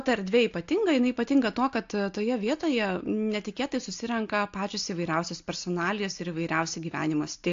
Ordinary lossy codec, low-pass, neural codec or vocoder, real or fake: AAC, 48 kbps; 7.2 kHz; none; real